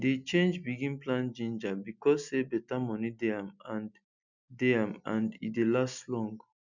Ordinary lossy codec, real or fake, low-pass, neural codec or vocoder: none; real; 7.2 kHz; none